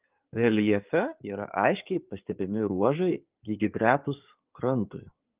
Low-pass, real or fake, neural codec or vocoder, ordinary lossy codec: 3.6 kHz; fake; codec, 16 kHz in and 24 kHz out, 2.2 kbps, FireRedTTS-2 codec; Opus, 24 kbps